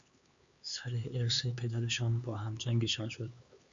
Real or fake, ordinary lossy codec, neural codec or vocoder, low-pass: fake; Opus, 64 kbps; codec, 16 kHz, 4 kbps, X-Codec, HuBERT features, trained on LibriSpeech; 7.2 kHz